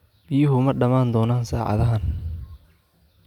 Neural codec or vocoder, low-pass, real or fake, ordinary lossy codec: none; 19.8 kHz; real; none